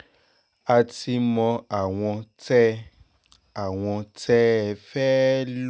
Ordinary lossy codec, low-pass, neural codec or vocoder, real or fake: none; none; none; real